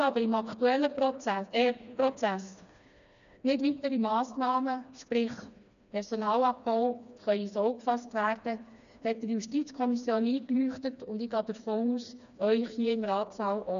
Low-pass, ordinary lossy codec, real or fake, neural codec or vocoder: 7.2 kHz; none; fake; codec, 16 kHz, 2 kbps, FreqCodec, smaller model